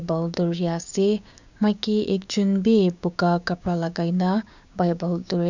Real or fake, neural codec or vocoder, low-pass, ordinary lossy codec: fake; codec, 16 kHz, 6 kbps, DAC; 7.2 kHz; none